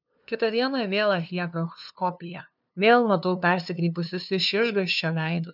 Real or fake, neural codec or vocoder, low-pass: fake; codec, 16 kHz, 2 kbps, FunCodec, trained on LibriTTS, 25 frames a second; 5.4 kHz